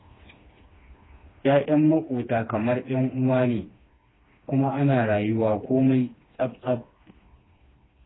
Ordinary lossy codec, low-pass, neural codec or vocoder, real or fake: AAC, 16 kbps; 7.2 kHz; codec, 16 kHz, 2 kbps, FreqCodec, smaller model; fake